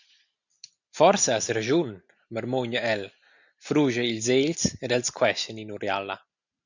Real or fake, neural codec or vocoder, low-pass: real; none; 7.2 kHz